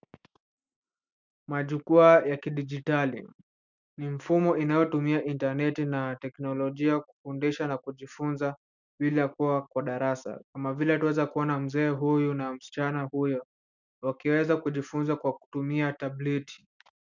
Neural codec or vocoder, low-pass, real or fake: none; 7.2 kHz; real